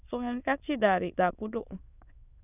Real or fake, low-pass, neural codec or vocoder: fake; 3.6 kHz; autoencoder, 22.05 kHz, a latent of 192 numbers a frame, VITS, trained on many speakers